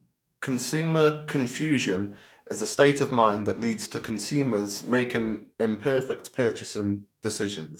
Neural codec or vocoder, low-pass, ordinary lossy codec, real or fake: codec, 44.1 kHz, 2.6 kbps, DAC; 19.8 kHz; none; fake